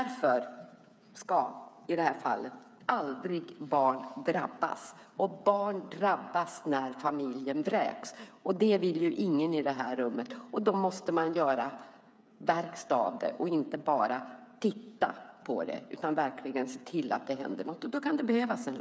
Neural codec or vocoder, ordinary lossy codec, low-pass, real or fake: codec, 16 kHz, 8 kbps, FreqCodec, smaller model; none; none; fake